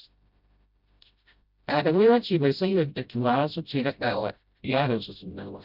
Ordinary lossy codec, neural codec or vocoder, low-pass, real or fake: Opus, 64 kbps; codec, 16 kHz, 0.5 kbps, FreqCodec, smaller model; 5.4 kHz; fake